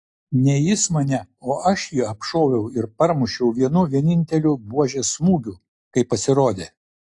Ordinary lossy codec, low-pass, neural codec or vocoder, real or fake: AAC, 48 kbps; 10.8 kHz; none; real